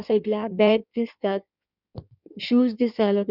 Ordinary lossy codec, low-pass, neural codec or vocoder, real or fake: none; 5.4 kHz; codec, 16 kHz in and 24 kHz out, 1.1 kbps, FireRedTTS-2 codec; fake